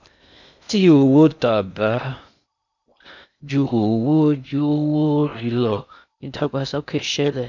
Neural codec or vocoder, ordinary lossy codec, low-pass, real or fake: codec, 16 kHz in and 24 kHz out, 0.6 kbps, FocalCodec, streaming, 2048 codes; none; 7.2 kHz; fake